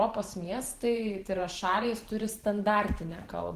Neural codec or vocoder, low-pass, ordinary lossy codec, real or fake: vocoder, 44.1 kHz, 128 mel bands every 512 samples, BigVGAN v2; 14.4 kHz; Opus, 16 kbps; fake